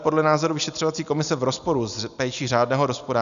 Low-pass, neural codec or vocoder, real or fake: 7.2 kHz; none; real